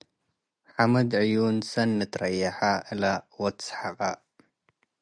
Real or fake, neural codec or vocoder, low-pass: real; none; 9.9 kHz